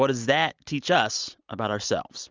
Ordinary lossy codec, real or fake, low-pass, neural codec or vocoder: Opus, 32 kbps; real; 7.2 kHz; none